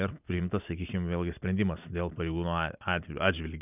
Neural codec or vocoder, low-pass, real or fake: none; 3.6 kHz; real